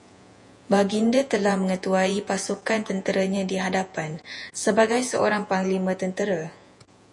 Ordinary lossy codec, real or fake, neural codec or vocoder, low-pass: MP3, 48 kbps; fake; vocoder, 48 kHz, 128 mel bands, Vocos; 10.8 kHz